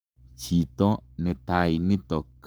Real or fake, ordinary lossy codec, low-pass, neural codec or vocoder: fake; none; none; codec, 44.1 kHz, 7.8 kbps, Pupu-Codec